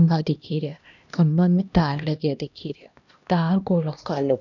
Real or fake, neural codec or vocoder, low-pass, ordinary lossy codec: fake; codec, 16 kHz, 1 kbps, X-Codec, HuBERT features, trained on LibriSpeech; 7.2 kHz; none